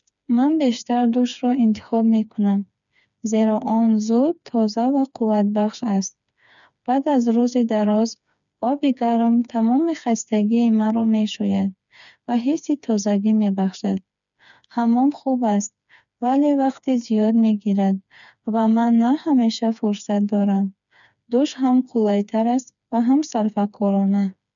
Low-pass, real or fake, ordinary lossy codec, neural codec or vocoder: 7.2 kHz; fake; none; codec, 16 kHz, 4 kbps, FreqCodec, smaller model